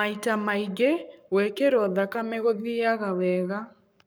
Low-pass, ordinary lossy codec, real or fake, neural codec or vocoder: none; none; fake; codec, 44.1 kHz, 7.8 kbps, Pupu-Codec